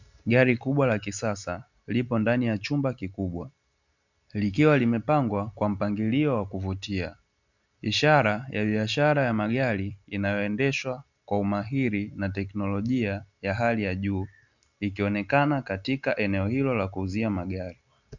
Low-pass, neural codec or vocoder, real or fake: 7.2 kHz; none; real